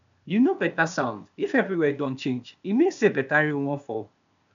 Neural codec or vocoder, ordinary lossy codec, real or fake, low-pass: codec, 16 kHz, 0.8 kbps, ZipCodec; none; fake; 7.2 kHz